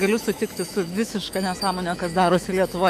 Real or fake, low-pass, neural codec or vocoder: fake; 14.4 kHz; autoencoder, 48 kHz, 128 numbers a frame, DAC-VAE, trained on Japanese speech